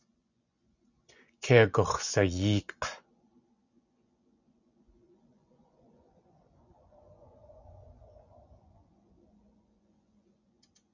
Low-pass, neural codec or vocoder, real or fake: 7.2 kHz; vocoder, 24 kHz, 100 mel bands, Vocos; fake